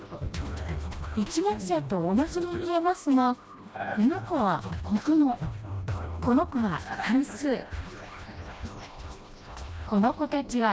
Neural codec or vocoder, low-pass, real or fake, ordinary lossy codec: codec, 16 kHz, 1 kbps, FreqCodec, smaller model; none; fake; none